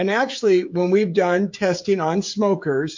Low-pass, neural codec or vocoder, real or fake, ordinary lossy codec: 7.2 kHz; codec, 16 kHz, 16 kbps, FreqCodec, smaller model; fake; MP3, 48 kbps